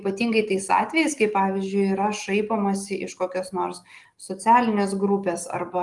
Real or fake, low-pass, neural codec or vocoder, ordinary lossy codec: real; 10.8 kHz; none; Opus, 24 kbps